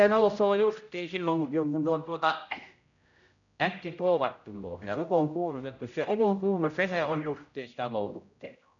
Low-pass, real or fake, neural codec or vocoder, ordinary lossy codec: 7.2 kHz; fake; codec, 16 kHz, 0.5 kbps, X-Codec, HuBERT features, trained on general audio; none